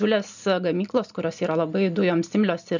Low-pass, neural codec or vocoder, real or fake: 7.2 kHz; none; real